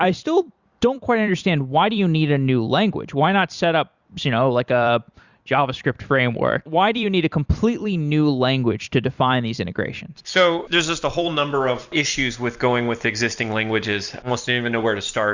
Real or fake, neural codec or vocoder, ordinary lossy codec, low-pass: fake; vocoder, 44.1 kHz, 128 mel bands every 256 samples, BigVGAN v2; Opus, 64 kbps; 7.2 kHz